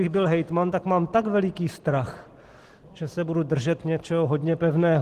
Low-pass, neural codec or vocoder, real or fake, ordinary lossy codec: 14.4 kHz; none; real; Opus, 16 kbps